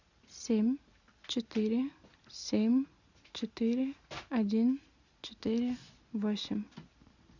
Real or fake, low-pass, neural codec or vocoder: real; 7.2 kHz; none